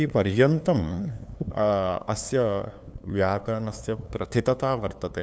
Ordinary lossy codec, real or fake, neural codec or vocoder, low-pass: none; fake; codec, 16 kHz, 2 kbps, FunCodec, trained on LibriTTS, 25 frames a second; none